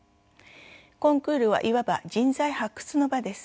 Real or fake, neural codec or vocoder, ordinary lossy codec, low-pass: real; none; none; none